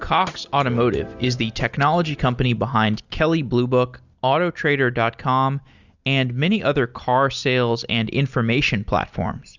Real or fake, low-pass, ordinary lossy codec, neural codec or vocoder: real; 7.2 kHz; Opus, 64 kbps; none